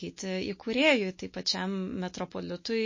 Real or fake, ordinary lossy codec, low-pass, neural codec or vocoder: real; MP3, 32 kbps; 7.2 kHz; none